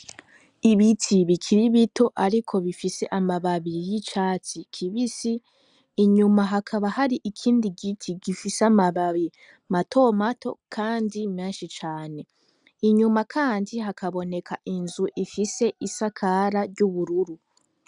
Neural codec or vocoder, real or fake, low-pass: none; real; 9.9 kHz